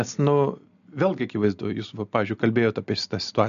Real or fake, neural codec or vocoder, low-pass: real; none; 7.2 kHz